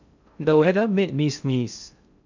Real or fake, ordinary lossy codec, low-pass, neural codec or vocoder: fake; none; 7.2 kHz; codec, 16 kHz in and 24 kHz out, 0.6 kbps, FocalCodec, streaming, 2048 codes